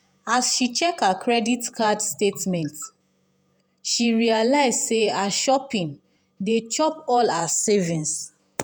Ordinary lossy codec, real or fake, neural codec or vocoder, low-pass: none; fake; vocoder, 48 kHz, 128 mel bands, Vocos; none